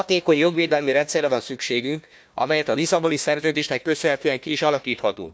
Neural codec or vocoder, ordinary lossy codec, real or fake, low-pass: codec, 16 kHz, 1 kbps, FunCodec, trained on Chinese and English, 50 frames a second; none; fake; none